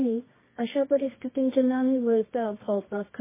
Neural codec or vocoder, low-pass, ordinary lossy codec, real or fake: codec, 24 kHz, 0.9 kbps, WavTokenizer, medium music audio release; 3.6 kHz; MP3, 16 kbps; fake